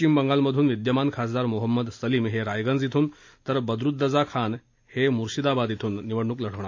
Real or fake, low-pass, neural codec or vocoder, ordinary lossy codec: real; 7.2 kHz; none; AAC, 48 kbps